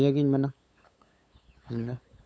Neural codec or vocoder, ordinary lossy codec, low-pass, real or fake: codec, 16 kHz, 8 kbps, FunCodec, trained on LibriTTS, 25 frames a second; none; none; fake